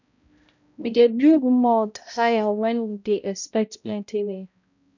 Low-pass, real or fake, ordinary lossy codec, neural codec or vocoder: 7.2 kHz; fake; none; codec, 16 kHz, 0.5 kbps, X-Codec, HuBERT features, trained on balanced general audio